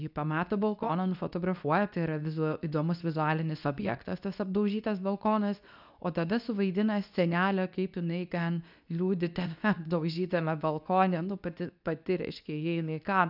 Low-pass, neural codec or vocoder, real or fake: 5.4 kHz; codec, 24 kHz, 0.9 kbps, WavTokenizer, medium speech release version 2; fake